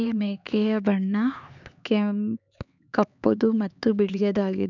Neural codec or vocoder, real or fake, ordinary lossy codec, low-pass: codec, 16 kHz, 4 kbps, X-Codec, HuBERT features, trained on LibriSpeech; fake; none; 7.2 kHz